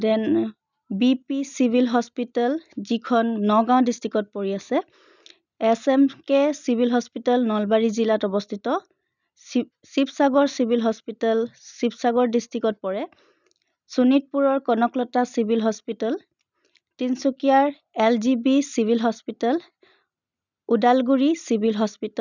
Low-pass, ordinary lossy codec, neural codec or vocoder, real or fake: 7.2 kHz; none; none; real